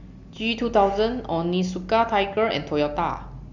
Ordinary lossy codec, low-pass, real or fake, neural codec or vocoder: none; 7.2 kHz; real; none